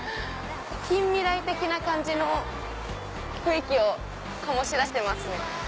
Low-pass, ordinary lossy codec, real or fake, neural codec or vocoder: none; none; real; none